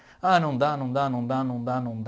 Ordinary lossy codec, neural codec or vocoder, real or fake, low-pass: none; none; real; none